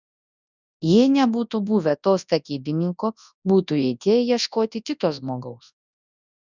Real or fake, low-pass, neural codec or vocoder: fake; 7.2 kHz; codec, 24 kHz, 0.9 kbps, WavTokenizer, large speech release